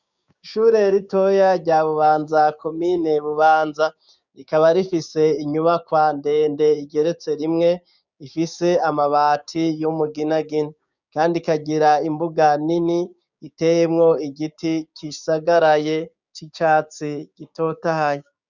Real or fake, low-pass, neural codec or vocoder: fake; 7.2 kHz; codec, 16 kHz, 6 kbps, DAC